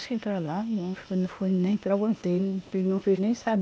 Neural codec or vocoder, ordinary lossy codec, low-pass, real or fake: codec, 16 kHz, 0.8 kbps, ZipCodec; none; none; fake